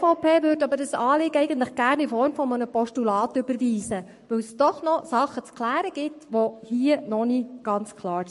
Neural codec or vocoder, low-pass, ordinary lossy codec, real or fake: codec, 44.1 kHz, 7.8 kbps, Pupu-Codec; 14.4 kHz; MP3, 48 kbps; fake